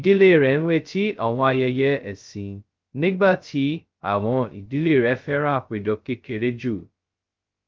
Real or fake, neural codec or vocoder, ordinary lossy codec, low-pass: fake; codec, 16 kHz, 0.2 kbps, FocalCodec; Opus, 32 kbps; 7.2 kHz